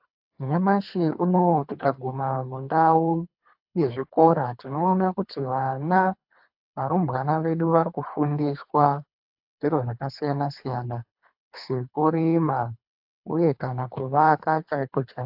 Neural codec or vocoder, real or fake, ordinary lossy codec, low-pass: codec, 24 kHz, 3 kbps, HILCodec; fake; AAC, 48 kbps; 5.4 kHz